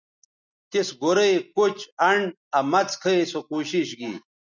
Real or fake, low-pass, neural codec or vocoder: real; 7.2 kHz; none